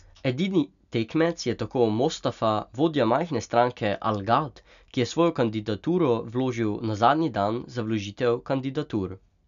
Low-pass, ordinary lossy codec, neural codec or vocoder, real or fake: 7.2 kHz; none; none; real